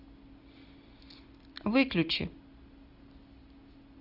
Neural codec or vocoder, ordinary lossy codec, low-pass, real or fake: none; Opus, 64 kbps; 5.4 kHz; real